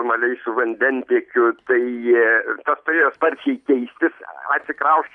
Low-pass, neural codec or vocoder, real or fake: 10.8 kHz; none; real